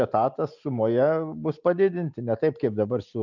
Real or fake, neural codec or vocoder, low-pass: real; none; 7.2 kHz